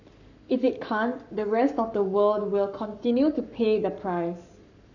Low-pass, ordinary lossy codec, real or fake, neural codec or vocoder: 7.2 kHz; none; fake; codec, 44.1 kHz, 7.8 kbps, Pupu-Codec